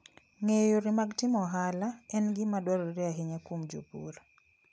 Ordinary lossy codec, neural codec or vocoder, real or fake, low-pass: none; none; real; none